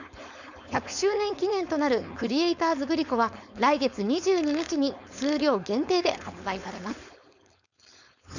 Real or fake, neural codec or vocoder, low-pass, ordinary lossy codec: fake; codec, 16 kHz, 4.8 kbps, FACodec; 7.2 kHz; none